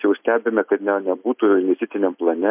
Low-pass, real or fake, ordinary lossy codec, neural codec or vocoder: 3.6 kHz; real; MP3, 32 kbps; none